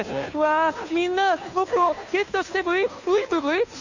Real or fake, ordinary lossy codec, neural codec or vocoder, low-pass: fake; none; codec, 16 kHz, 2 kbps, FunCodec, trained on Chinese and English, 25 frames a second; 7.2 kHz